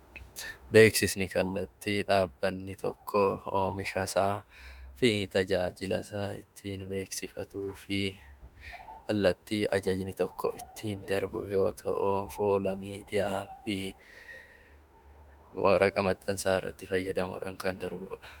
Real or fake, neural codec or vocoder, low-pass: fake; autoencoder, 48 kHz, 32 numbers a frame, DAC-VAE, trained on Japanese speech; 19.8 kHz